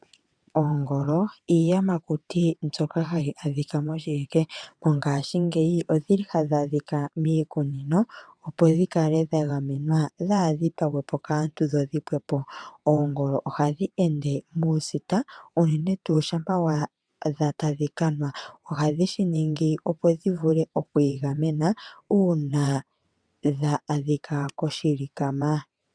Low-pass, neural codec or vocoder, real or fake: 9.9 kHz; vocoder, 22.05 kHz, 80 mel bands, WaveNeXt; fake